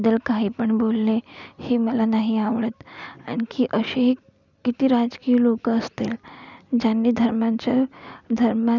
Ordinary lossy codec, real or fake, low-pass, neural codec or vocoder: none; real; 7.2 kHz; none